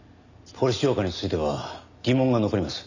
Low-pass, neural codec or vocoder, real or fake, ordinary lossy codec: 7.2 kHz; none; real; none